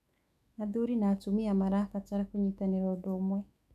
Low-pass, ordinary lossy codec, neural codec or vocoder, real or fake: 14.4 kHz; none; autoencoder, 48 kHz, 128 numbers a frame, DAC-VAE, trained on Japanese speech; fake